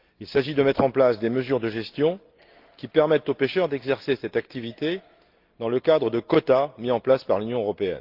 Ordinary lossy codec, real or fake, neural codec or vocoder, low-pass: Opus, 32 kbps; real; none; 5.4 kHz